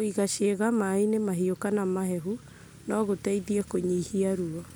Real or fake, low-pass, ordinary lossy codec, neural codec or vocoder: real; none; none; none